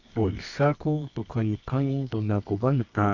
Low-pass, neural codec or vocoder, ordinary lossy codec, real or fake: 7.2 kHz; codec, 24 kHz, 0.9 kbps, WavTokenizer, medium music audio release; none; fake